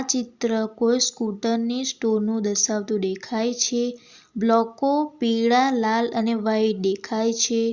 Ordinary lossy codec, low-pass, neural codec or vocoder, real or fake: Opus, 64 kbps; 7.2 kHz; none; real